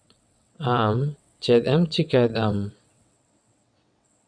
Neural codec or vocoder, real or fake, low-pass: vocoder, 22.05 kHz, 80 mel bands, WaveNeXt; fake; 9.9 kHz